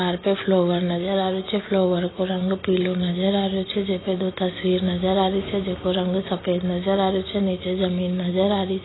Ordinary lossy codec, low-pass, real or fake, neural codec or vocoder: AAC, 16 kbps; 7.2 kHz; real; none